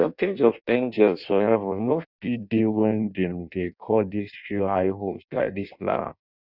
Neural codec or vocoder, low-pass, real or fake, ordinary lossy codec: codec, 16 kHz in and 24 kHz out, 0.6 kbps, FireRedTTS-2 codec; 5.4 kHz; fake; none